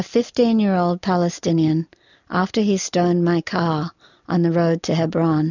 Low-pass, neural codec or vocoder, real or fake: 7.2 kHz; none; real